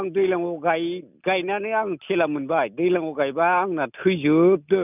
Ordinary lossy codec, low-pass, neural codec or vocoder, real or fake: none; 3.6 kHz; none; real